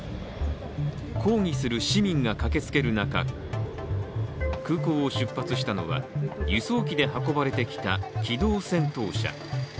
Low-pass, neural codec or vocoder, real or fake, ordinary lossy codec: none; none; real; none